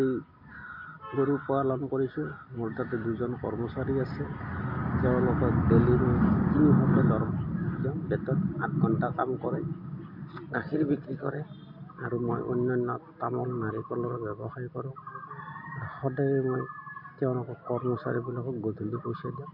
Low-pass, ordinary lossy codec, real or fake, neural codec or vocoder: 5.4 kHz; MP3, 32 kbps; real; none